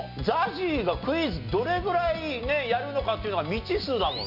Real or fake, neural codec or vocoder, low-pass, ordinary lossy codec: real; none; 5.4 kHz; AAC, 48 kbps